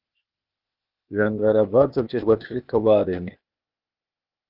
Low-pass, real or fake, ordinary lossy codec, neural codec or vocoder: 5.4 kHz; fake; Opus, 24 kbps; codec, 16 kHz, 0.8 kbps, ZipCodec